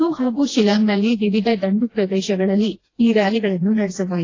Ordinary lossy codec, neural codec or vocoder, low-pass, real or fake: AAC, 32 kbps; codec, 16 kHz, 1 kbps, FreqCodec, smaller model; 7.2 kHz; fake